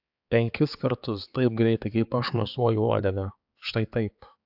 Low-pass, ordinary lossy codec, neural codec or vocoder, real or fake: 5.4 kHz; AAC, 48 kbps; codec, 16 kHz, 4 kbps, X-Codec, HuBERT features, trained on balanced general audio; fake